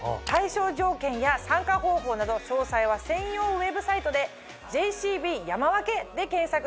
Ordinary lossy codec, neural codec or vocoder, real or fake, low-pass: none; none; real; none